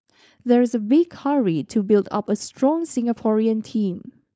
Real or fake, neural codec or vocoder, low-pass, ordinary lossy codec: fake; codec, 16 kHz, 4.8 kbps, FACodec; none; none